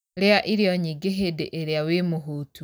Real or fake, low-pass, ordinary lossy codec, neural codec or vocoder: real; none; none; none